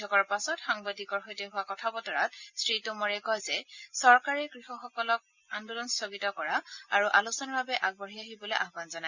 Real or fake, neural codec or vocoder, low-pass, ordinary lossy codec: real; none; 7.2 kHz; Opus, 64 kbps